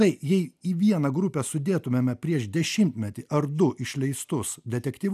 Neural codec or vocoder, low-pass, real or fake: none; 14.4 kHz; real